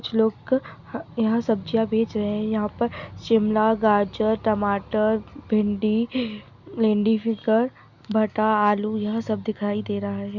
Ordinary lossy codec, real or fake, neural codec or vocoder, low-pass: Opus, 64 kbps; real; none; 7.2 kHz